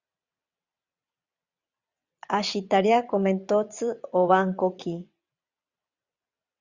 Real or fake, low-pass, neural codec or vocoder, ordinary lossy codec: fake; 7.2 kHz; vocoder, 22.05 kHz, 80 mel bands, WaveNeXt; Opus, 64 kbps